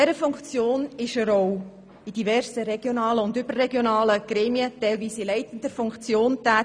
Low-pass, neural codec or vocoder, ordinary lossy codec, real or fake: 9.9 kHz; none; none; real